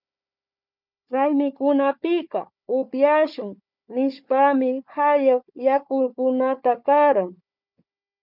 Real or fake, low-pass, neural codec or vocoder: fake; 5.4 kHz; codec, 16 kHz, 4 kbps, FunCodec, trained on Chinese and English, 50 frames a second